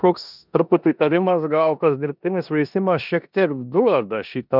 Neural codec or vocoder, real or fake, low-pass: codec, 16 kHz in and 24 kHz out, 0.9 kbps, LongCat-Audio-Codec, four codebook decoder; fake; 5.4 kHz